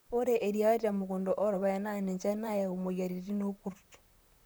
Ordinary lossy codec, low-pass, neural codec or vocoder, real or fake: none; none; vocoder, 44.1 kHz, 128 mel bands, Pupu-Vocoder; fake